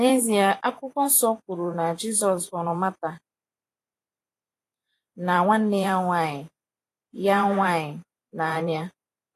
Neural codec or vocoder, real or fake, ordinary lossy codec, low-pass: vocoder, 44.1 kHz, 128 mel bands every 512 samples, BigVGAN v2; fake; AAC, 48 kbps; 14.4 kHz